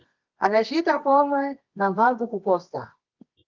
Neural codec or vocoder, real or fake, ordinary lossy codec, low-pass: codec, 24 kHz, 0.9 kbps, WavTokenizer, medium music audio release; fake; Opus, 24 kbps; 7.2 kHz